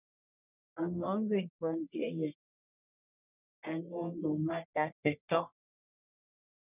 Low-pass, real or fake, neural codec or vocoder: 3.6 kHz; fake; codec, 44.1 kHz, 1.7 kbps, Pupu-Codec